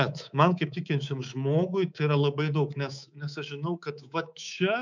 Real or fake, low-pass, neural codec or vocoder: fake; 7.2 kHz; codec, 24 kHz, 3.1 kbps, DualCodec